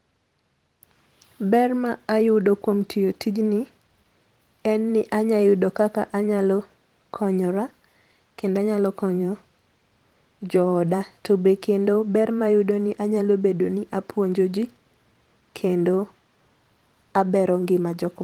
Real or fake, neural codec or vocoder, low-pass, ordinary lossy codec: fake; vocoder, 44.1 kHz, 128 mel bands every 512 samples, BigVGAN v2; 19.8 kHz; Opus, 24 kbps